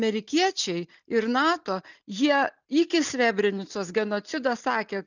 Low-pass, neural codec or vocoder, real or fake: 7.2 kHz; none; real